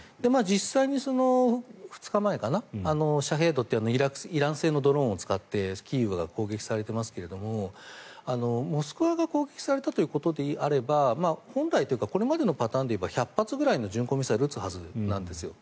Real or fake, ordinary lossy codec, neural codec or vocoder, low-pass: real; none; none; none